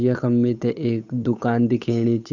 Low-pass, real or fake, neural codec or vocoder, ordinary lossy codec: 7.2 kHz; fake; codec, 16 kHz, 8 kbps, FunCodec, trained on Chinese and English, 25 frames a second; none